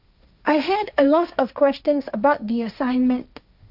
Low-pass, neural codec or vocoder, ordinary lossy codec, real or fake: 5.4 kHz; codec, 16 kHz, 1.1 kbps, Voila-Tokenizer; none; fake